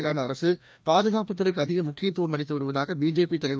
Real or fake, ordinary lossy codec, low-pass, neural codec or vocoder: fake; none; none; codec, 16 kHz, 1 kbps, FreqCodec, larger model